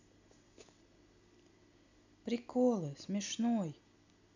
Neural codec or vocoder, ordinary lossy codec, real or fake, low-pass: none; none; real; 7.2 kHz